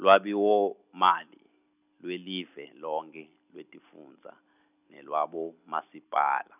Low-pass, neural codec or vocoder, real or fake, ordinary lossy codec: 3.6 kHz; none; real; none